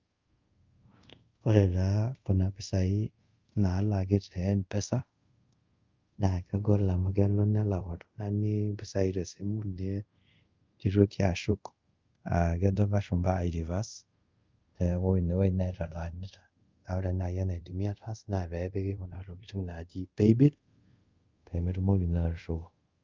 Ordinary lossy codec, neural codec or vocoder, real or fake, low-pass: Opus, 24 kbps; codec, 24 kHz, 0.5 kbps, DualCodec; fake; 7.2 kHz